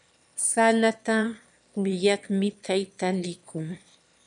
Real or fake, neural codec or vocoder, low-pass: fake; autoencoder, 22.05 kHz, a latent of 192 numbers a frame, VITS, trained on one speaker; 9.9 kHz